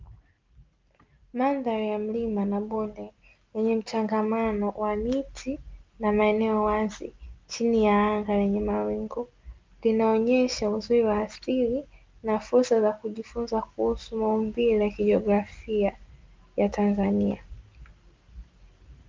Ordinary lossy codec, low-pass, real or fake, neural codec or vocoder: Opus, 32 kbps; 7.2 kHz; real; none